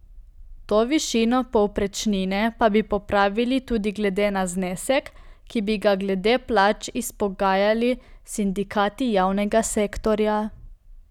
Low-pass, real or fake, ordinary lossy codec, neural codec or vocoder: 19.8 kHz; real; none; none